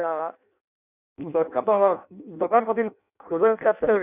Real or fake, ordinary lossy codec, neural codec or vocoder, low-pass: fake; none; codec, 16 kHz in and 24 kHz out, 0.6 kbps, FireRedTTS-2 codec; 3.6 kHz